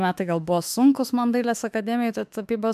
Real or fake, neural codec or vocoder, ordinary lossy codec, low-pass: fake; autoencoder, 48 kHz, 32 numbers a frame, DAC-VAE, trained on Japanese speech; MP3, 96 kbps; 14.4 kHz